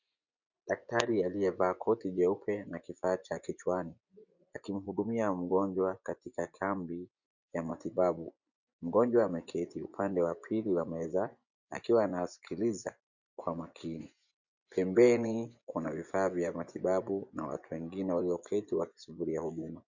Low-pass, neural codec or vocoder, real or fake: 7.2 kHz; vocoder, 24 kHz, 100 mel bands, Vocos; fake